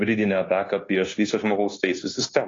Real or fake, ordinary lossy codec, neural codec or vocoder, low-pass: fake; AAC, 32 kbps; codec, 16 kHz, 0.9 kbps, LongCat-Audio-Codec; 7.2 kHz